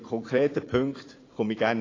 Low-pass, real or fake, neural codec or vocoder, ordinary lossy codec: 7.2 kHz; fake; vocoder, 44.1 kHz, 80 mel bands, Vocos; AAC, 32 kbps